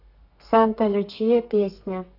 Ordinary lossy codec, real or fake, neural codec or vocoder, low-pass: AAC, 32 kbps; fake; codec, 32 kHz, 1.9 kbps, SNAC; 5.4 kHz